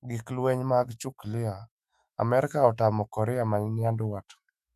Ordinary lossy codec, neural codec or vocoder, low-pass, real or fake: none; autoencoder, 48 kHz, 128 numbers a frame, DAC-VAE, trained on Japanese speech; 14.4 kHz; fake